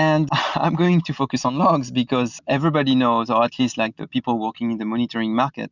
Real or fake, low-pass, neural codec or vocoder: real; 7.2 kHz; none